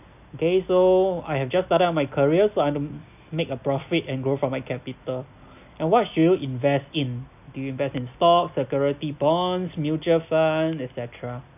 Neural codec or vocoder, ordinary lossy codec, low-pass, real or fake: none; none; 3.6 kHz; real